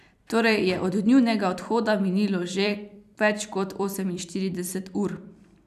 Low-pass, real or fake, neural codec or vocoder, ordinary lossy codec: 14.4 kHz; fake; vocoder, 44.1 kHz, 128 mel bands every 256 samples, BigVGAN v2; none